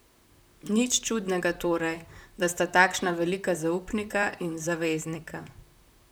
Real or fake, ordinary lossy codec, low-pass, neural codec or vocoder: fake; none; none; vocoder, 44.1 kHz, 128 mel bands, Pupu-Vocoder